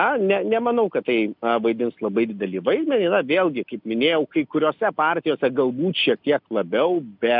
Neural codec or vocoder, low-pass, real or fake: none; 5.4 kHz; real